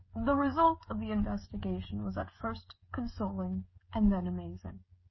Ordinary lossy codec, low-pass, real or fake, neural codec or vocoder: MP3, 24 kbps; 7.2 kHz; fake; codec, 16 kHz, 16 kbps, FreqCodec, smaller model